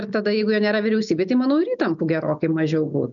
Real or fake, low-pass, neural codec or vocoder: real; 7.2 kHz; none